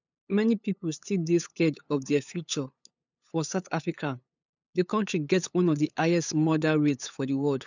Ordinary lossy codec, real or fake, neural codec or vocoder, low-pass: none; fake; codec, 16 kHz, 8 kbps, FunCodec, trained on LibriTTS, 25 frames a second; 7.2 kHz